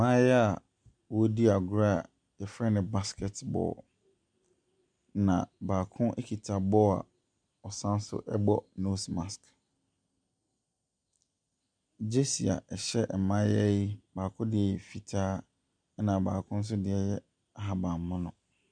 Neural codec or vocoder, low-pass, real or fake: none; 9.9 kHz; real